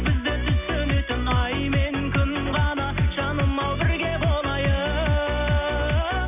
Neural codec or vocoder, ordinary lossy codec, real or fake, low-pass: none; none; real; 3.6 kHz